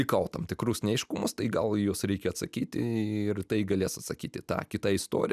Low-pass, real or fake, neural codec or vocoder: 14.4 kHz; real; none